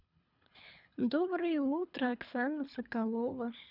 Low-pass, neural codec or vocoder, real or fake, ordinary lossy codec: 5.4 kHz; codec, 24 kHz, 3 kbps, HILCodec; fake; none